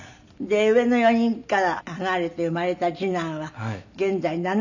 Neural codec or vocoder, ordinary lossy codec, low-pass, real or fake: none; none; 7.2 kHz; real